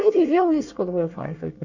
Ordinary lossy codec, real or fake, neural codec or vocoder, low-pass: none; fake; codec, 24 kHz, 1 kbps, SNAC; 7.2 kHz